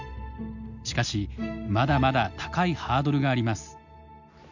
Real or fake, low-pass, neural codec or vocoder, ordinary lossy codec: real; 7.2 kHz; none; none